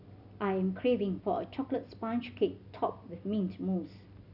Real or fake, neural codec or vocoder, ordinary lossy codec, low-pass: real; none; none; 5.4 kHz